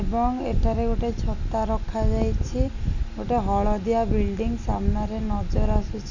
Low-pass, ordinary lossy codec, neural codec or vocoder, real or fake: 7.2 kHz; none; none; real